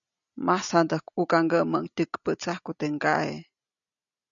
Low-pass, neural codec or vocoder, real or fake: 7.2 kHz; none; real